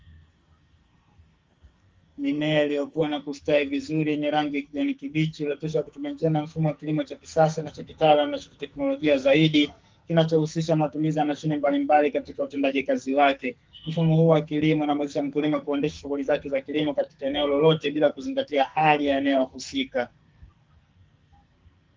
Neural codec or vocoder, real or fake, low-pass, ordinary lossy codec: codec, 44.1 kHz, 2.6 kbps, SNAC; fake; 7.2 kHz; Opus, 32 kbps